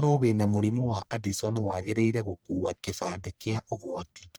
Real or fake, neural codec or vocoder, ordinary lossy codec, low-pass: fake; codec, 44.1 kHz, 1.7 kbps, Pupu-Codec; none; none